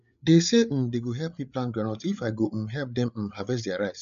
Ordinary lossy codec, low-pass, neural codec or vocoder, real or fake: none; 7.2 kHz; codec, 16 kHz, 8 kbps, FreqCodec, larger model; fake